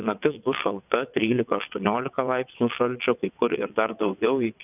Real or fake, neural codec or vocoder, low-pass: fake; vocoder, 22.05 kHz, 80 mel bands, WaveNeXt; 3.6 kHz